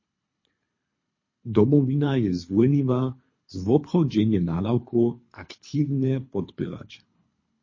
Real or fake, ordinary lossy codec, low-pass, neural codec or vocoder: fake; MP3, 32 kbps; 7.2 kHz; codec, 24 kHz, 3 kbps, HILCodec